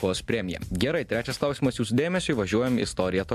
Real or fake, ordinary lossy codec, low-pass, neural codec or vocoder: real; AAC, 96 kbps; 14.4 kHz; none